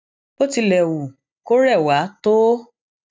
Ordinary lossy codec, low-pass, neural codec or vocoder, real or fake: none; none; none; real